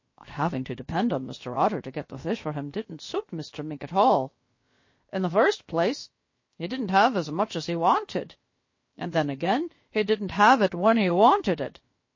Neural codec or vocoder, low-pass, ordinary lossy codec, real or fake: codec, 16 kHz, about 1 kbps, DyCAST, with the encoder's durations; 7.2 kHz; MP3, 32 kbps; fake